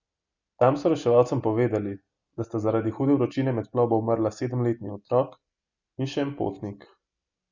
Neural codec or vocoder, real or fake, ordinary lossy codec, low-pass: none; real; Opus, 64 kbps; 7.2 kHz